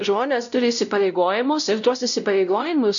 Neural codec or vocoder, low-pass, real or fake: codec, 16 kHz, 0.5 kbps, X-Codec, WavLM features, trained on Multilingual LibriSpeech; 7.2 kHz; fake